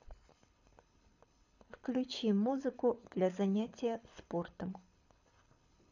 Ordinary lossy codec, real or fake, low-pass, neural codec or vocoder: none; fake; 7.2 kHz; codec, 24 kHz, 6 kbps, HILCodec